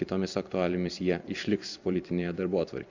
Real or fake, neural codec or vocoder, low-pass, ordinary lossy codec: fake; vocoder, 44.1 kHz, 128 mel bands every 256 samples, BigVGAN v2; 7.2 kHz; Opus, 64 kbps